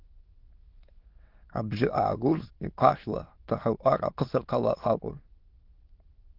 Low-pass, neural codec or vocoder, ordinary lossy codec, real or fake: 5.4 kHz; autoencoder, 22.05 kHz, a latent of 192 numbers a frame, VITS, trained on many speakers; Opus, 16 kbps; fake